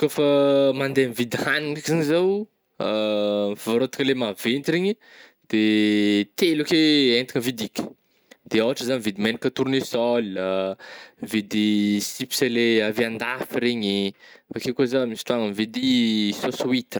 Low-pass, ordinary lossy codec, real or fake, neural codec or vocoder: none; none; real; none